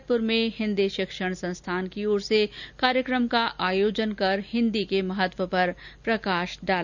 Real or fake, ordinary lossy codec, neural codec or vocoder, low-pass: real; none; none; 7.2 kHz